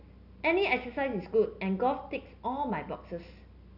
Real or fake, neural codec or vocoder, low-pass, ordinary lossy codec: real; none; 5.4 kHz; none